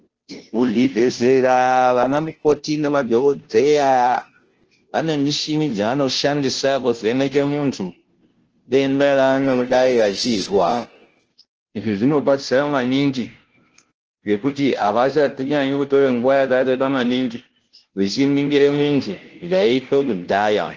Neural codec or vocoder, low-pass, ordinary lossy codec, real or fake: codec, 16 kHz, 0.5 kbps, FunCodec, trained on Chinese and English, 25 frames a second; 7.2 kHz; Opus, 16 kbps; fake